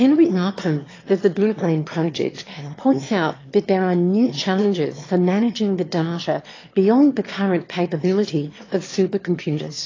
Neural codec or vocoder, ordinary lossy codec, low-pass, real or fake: autoencoder, 22.05 kHz, a latent of 192 numbers a frame, VITS, trained on one speaker; AAC, 32 kbps; 7.2 kHz; fake